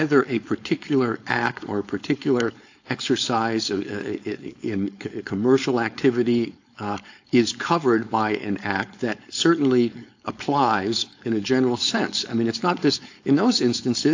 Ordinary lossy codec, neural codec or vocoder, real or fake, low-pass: AAC, 48 kbps; codec, 16 kHz, 4.8 kbps, FACodec; fake; 7.2 kHz